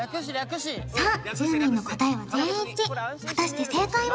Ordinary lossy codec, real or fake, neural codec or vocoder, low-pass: none; real; none; none